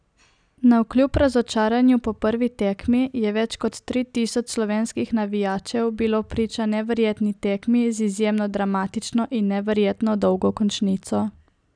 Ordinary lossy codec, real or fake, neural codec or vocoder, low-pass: none; real; none; 9.9 kHz